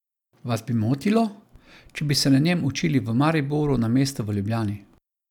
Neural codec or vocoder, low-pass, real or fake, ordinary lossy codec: none; 19.8 kHz; real; none